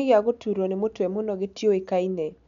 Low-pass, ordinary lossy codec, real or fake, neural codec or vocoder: 7.2 kHz; none; real; none